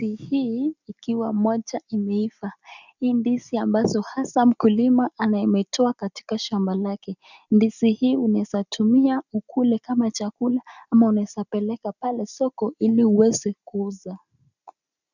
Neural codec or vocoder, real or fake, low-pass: none; real; 7.2 kHz